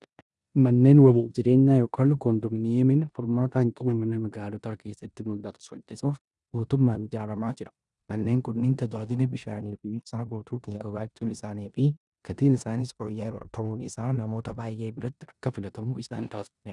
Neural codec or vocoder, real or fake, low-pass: codec, 16 kHz in and 24 kHz out, 0.9 kbps, LongCat-Audio-Codec, four codebook decoder; fake; 10.8 kHz